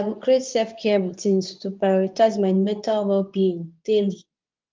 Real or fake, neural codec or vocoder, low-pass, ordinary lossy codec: fake; codec, 24 kHz, 0.9 kbps, WavTokenizer, medium speech release version 2; 7.2 kHz; Opus, 24 kbps